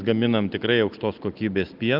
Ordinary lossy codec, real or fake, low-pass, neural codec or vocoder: Opus, 32 kbps; real; 5.4 kHz; none